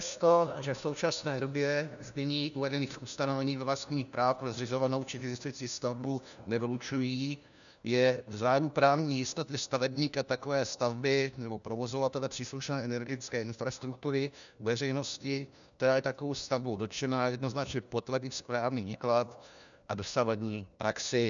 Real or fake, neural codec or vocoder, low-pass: fake; codec, 16 kHz, 1 kbps, FunCodec, trained on LibriTTS, 50 frames a second; 7.2 kHz